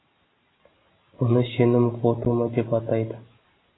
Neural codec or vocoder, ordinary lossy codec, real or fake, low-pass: none; AAC, 16 kbps; real; 7.2 kHz